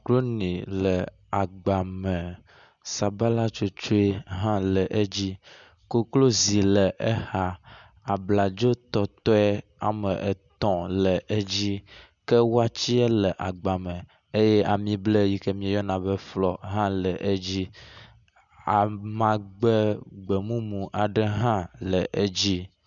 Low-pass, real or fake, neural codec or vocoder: 7.2 kHz; real; none